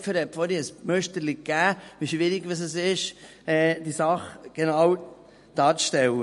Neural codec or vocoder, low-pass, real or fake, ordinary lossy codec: none; 14.4 kHz; real; MP3, 48 kbps